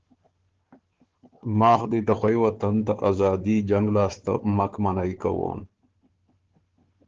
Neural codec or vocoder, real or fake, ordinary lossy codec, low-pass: codec, 16 kHz, 4 kbps, FunCodec, trained on Chinese and English, 50 frames a second; fake; Opus, 16 kbps; 7.2 kHz